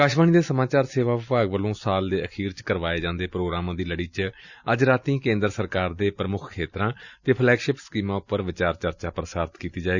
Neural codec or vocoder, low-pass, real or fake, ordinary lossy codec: none; 7.2 kHz; real; none